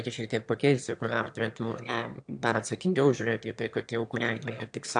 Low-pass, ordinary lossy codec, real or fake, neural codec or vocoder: 9.9 kHz; AAC, 64 kbps; fake; autoencoder, 22.05 kHz, a latent of 192 numbers a frame, VITS, trained on one speaker